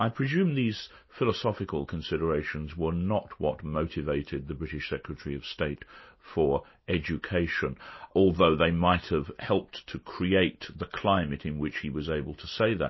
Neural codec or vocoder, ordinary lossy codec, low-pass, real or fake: none; MP3, 24 kbps; 7.2 kHz; real